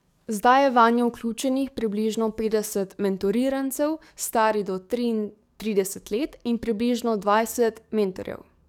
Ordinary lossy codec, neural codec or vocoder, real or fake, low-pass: none; codec, 44.1 kHz, 7.8 kbps, DAC; fake; 19.8 kHz